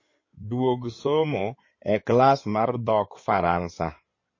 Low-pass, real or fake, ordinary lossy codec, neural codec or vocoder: 7.2 kHz; fake; MP3, 32 kbps; codec, 16 kHz in and 24 kHz out, 2.2 kbps, FireRedTTS-2 codec